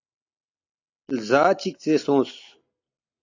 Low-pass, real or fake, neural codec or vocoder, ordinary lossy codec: 7.2 kHz; real; none; AAC, 48 kbps